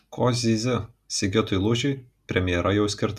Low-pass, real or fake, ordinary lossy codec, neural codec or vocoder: 14.4 kHz; real; MP3, 96 kbps; none